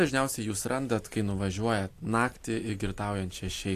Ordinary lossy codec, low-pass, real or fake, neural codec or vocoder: AAC, 64 kbps; 14.4 kHz; real; none